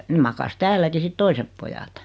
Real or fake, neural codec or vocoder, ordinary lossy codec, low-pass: real; none; none; none